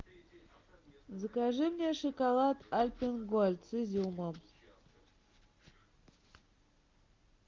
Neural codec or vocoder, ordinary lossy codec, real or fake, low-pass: none; Opus, 32 kbps; real; 7.2 kHz